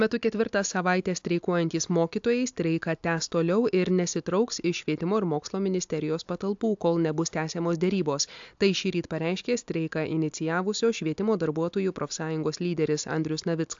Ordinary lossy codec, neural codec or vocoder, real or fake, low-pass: AAC, 64 kbps; none; real; 7.2 kHz